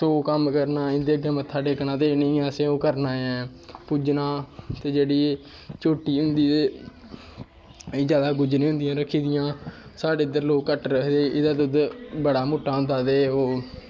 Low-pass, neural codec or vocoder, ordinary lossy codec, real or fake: none; none; none; real